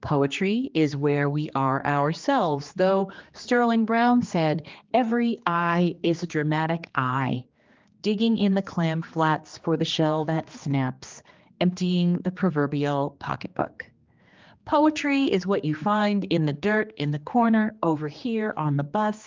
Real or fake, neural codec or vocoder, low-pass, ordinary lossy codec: fake; codec, 16 kHz, 2 kbps, X-Codec, HuBERT features, trained on general audio; 7.2 kHz; Opus, 24 kbps